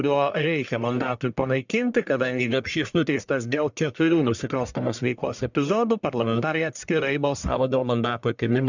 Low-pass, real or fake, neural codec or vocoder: 7.2 kHz; fake; codec, 44.1 kHz, 1.7 kbps, Pupu-Codec